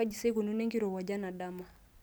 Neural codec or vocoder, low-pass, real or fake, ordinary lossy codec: none; none; real; none